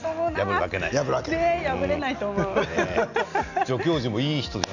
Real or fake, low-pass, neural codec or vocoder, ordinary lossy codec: real; 7.2 kHz; none; none